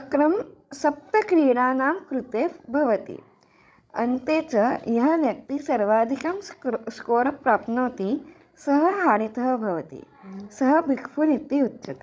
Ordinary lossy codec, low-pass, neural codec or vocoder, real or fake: none; none; codec, 16 kHz, 16 kbps, FreqCodec, larger model; fake